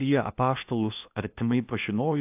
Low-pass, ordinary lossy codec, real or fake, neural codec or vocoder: 3.6 kHz; AAC, 32 kbps; fake; codec, 16 kHz in and 24 kHz out, 0.8 kbps, FocalCodec, streaming, 65536 codes